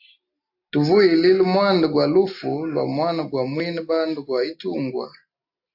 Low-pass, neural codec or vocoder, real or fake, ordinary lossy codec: 5.4 kHz; none; real; AAC, 48 kbps